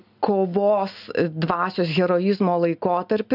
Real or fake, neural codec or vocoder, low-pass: real; none; 5.4 kHz